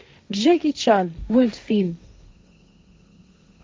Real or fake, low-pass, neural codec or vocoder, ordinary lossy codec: fake; none; codec, 16 kHz, 1.1 kbps, Voila-Tokenizer; none